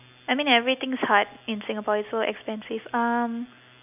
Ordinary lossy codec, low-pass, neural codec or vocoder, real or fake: none; 3.6 kHz; none; real